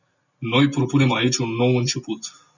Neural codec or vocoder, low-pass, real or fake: none; 7.2 kHz; real